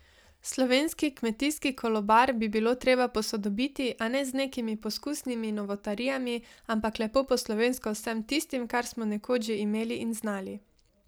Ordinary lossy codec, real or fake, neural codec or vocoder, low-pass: none; real; none; none